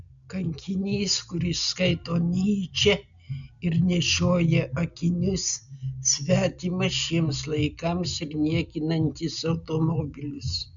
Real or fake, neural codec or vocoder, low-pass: real; none; 7.2 kHz